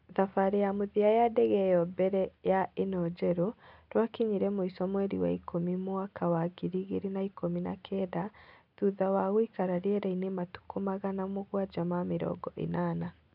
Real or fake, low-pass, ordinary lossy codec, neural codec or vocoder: real; 5.4 kHz; none; none